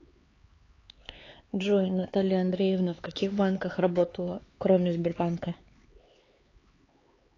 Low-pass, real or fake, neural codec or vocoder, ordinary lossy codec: 7.2 kHz; fake; codec, 16 kHz, 4 kbps, X-Codec, HuBERT features, trained on LibriSpeech; AAC, 32 kbps